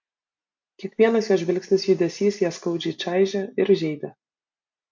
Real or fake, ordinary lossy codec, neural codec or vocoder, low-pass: real; MP3, 64 kbps; none; 7.2 kHz